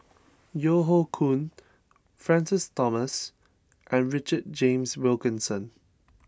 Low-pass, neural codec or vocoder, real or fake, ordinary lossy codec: none; none; real; none